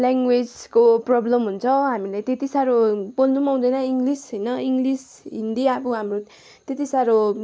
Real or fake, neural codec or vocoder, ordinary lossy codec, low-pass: real; none; none; none